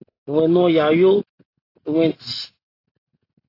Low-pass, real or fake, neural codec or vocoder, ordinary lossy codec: 5.4 kHz; real; none; AAC, 24 kbps